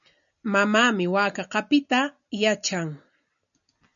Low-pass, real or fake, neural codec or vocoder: 7.2 kHz; real; none